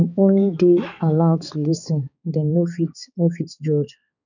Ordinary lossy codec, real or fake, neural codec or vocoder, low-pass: none; fake; codec, 16 kHz, 4 kbps, X-Codec, HuBERT features, trained on balanced general audio; 7.2 kHz